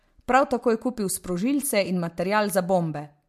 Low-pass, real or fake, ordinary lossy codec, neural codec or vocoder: 14.4 kHz; real; MP3, 64 kbps; none